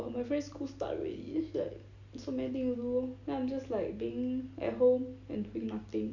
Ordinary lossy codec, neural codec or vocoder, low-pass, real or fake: none; none; 7.2 kHz; real